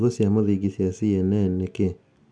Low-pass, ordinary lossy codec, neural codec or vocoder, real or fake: 9.9 kHz; MP3, 64 kbps; none; real